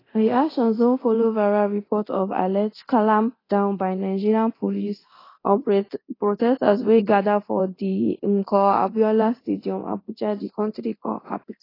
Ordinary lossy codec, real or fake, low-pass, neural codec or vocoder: AAC, 24 kbps; fake; 5.4 kHz; codec, 24 kHz, 0.9 kbps, DualCodec